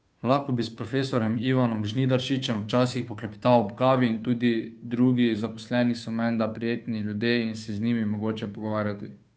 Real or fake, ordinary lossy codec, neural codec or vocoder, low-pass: fake; none; codec, 16 kHz, 2 kbps, FunCodec, trained on Chinese and English, 25 frames a second; none